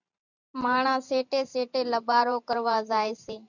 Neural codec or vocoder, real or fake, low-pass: vocoder, 44.1 kHz, 128 mel bands every 512 samples, BigVGAN v2; fake; 7.2 kHz